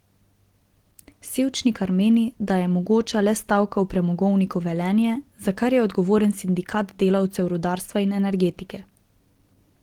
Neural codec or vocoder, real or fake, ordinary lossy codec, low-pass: none; real; Opus, 16 kbps; 19.8 kHz